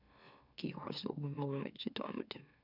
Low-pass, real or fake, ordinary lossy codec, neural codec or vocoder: 5.4 kHz; fake; none; autoencoder, 44.1 kHz, a latent of 192 numbers a frame, MeloTTS